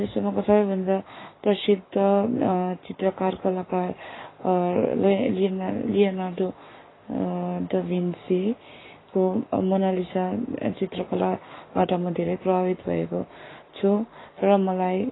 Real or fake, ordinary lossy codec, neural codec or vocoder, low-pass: fake; AAC, 16 kbps; codec, 44.1 kHz, 7.8 kbps, Pupu-Codec; 7.2 kHz